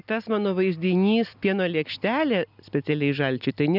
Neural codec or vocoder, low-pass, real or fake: none; 5.4 kHz; real